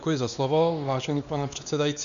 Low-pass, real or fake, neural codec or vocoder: 7.2 kHz; fake; codec, 16 kHz, 2 kbps, X-Codec, WavLM features, trained on Multilingual LibriSpeech